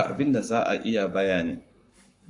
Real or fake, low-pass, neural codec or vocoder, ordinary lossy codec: fake; 10.8 kHz; codec, 44.1 kHz, 7.8 kbps, Pupu-Codec; AAC, 64 kbps